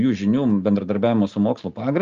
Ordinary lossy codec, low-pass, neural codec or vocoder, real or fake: Opus, 32 kbps; 7.2 kHz; none; real